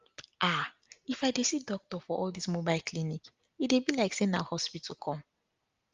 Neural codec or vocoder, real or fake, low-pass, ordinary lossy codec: none; real; 7.2 kHz; Opus, 32 kbps